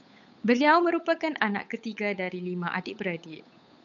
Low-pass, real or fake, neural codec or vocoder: 7.2 kHz; fake; codec, 16 kHz, 8 kbps, FunCodec, trained on Chinese and English, 25 frames a second